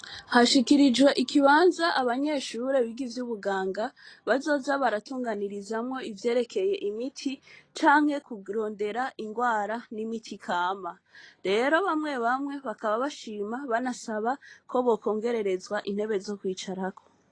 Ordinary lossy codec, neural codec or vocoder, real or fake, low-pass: AAC, 32 kbps; none; real; 9.9 kHz